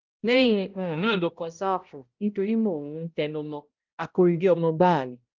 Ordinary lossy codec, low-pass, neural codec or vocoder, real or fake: Opus, 24 kbps; 7.2 kHz; codec, 16 kHz, 0.5 kbps, X-Codec, HuBERT features, trained on balanced general audio; fake